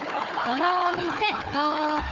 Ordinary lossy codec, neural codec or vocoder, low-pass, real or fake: Opus, 24 kbps; codec, 16 kHz, 4 kbps, FunCodec, trained on Chinese and English, 50 frames a second; 7.2 kHz; fake